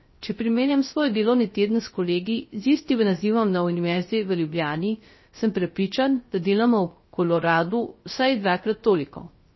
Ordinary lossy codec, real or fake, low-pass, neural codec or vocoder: MP3, 24 kbps; fake; 7.2 kHz; codec, 16 kHz, 0.3 kbps, FocalCodec